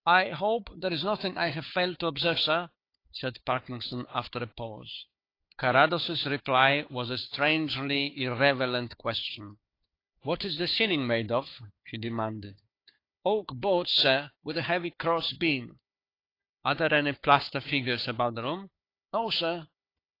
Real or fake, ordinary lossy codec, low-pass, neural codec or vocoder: fake; AAC, 32 kbps; 5.4 kHz; codec, 16 kHz, 4 kbps, FunCodec, trained on Chinese and English, 50 frames a second